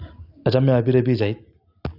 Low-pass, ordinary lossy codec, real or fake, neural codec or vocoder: 5.4 kHz; none; real; none